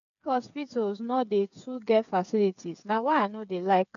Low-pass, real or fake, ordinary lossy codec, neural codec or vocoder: 7.2 kHz; fake; none; codec, 16 kHz, 8 kbps, FreqCodec, smaller model